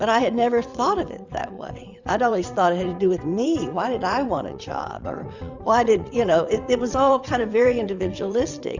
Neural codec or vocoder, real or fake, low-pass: vocoder, 22.05 kHz, 80 mel bands, WaveNeXt; fake; 7.2 kHz